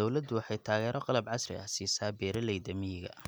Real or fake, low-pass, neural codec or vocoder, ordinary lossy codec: real; none; none; none